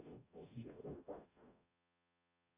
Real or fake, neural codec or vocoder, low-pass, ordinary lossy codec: fake; codec, 44.1 kHz, 0.9 kbps, DAC; 3.6 kHz; AAC, 32 kbps